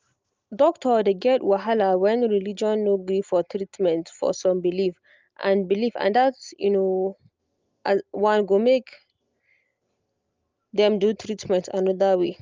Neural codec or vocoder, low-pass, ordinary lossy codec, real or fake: none; 7.2 kHz; Opus, 24 kbps; real